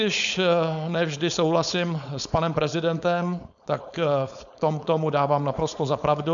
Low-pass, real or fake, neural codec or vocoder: 7.2 kHz; fake; codec, 16 kHz, 4.8 kbps, FACodec